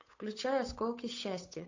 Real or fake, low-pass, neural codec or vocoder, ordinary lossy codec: fake; 7.2 kHz; codec, 16 kHz, 8 kbps, FunCodec, trained on Chinese and English, 25 frames a second; AAC, 48 kbps